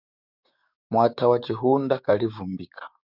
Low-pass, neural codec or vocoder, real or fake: 5.4 kHz; codec, 16 kHz, 6 kbps, DAC; fake